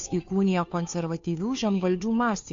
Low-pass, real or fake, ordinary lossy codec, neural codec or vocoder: 7.2 kHz; fake; MP3, 48 kbps; codec, 16 kHz, 2 kbps, FunCodec, trained on Chinese and English, 25 frames a second